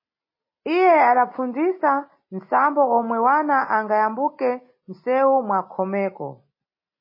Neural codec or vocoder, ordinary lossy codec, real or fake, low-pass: none; MP3, 24 kbps; real; 5.4 kHz